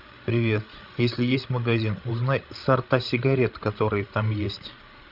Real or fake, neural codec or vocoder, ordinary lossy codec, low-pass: fake; codec, 16 kHz, 16 kbps, FreqCodec, larger model; Opus, 32 kbps; 5.4 kHz